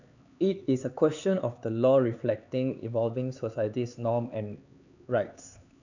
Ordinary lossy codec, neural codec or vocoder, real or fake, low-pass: none; codec, 16 kHz, 4 kbps, X-Codec, HuBERT features, trained on LibriSpeech; fake; 7.2 kHz